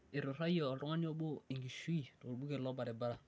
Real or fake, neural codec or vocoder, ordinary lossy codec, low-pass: real; none; none; none